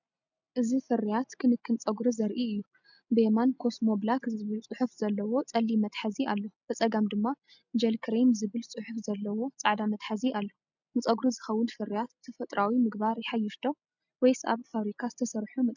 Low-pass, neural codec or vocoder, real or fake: 7.2 kHz; none; real